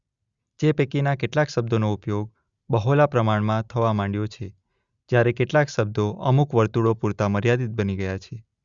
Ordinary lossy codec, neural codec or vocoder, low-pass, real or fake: Opus, 64 kbps; none; 7.2 kHz; real